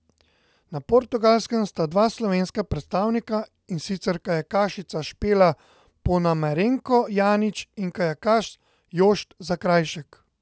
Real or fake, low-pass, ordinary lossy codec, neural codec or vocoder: real; none; none; none